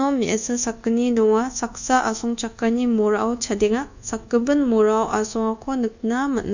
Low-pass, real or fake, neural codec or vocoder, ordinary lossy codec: 7.2 kHz; fake; codec, 24 kHz, 1.2 kbps, DualCodec; none